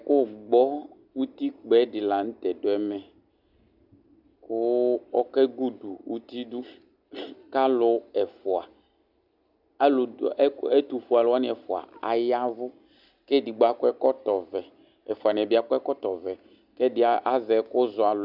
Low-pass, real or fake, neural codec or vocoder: 5.4 kHz; real; none